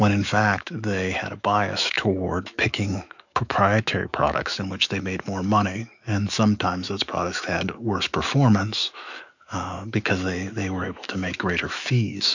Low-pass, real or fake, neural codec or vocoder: 7.2 kHz; fake; autoencoder, 48 kHz, 128 numbers a frame, DAC-VAE, trained on Japanese speech